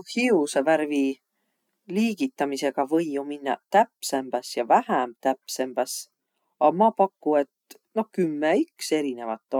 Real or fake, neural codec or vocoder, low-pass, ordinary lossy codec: real; none; 19.8 kHz; none